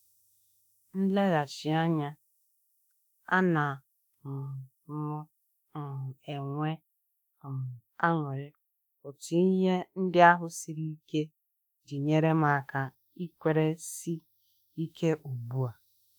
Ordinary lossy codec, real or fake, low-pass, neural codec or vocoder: none; fake; none; autoencoder, 48 kHz, 32 numbers a frame, DAC-VAE, trained on Japanese speech